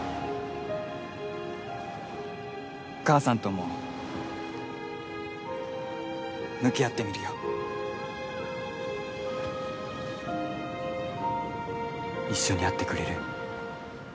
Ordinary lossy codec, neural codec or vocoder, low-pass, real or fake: none; none; none; real